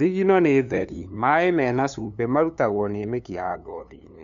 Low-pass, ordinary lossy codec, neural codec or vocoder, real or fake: 7.2 kHz; none; codec, 16 kHz, 2 kbps, FunCodec, trained on LibriTTS, 25 frames a second; fake